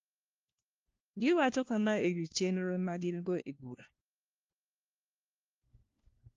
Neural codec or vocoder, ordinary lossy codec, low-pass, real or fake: codec, 16 kHz, 1 kbps, FunCodec, trained on LibriTTS, 50 frames a second; Opus, 32 kbps; 7.2 kHz; fake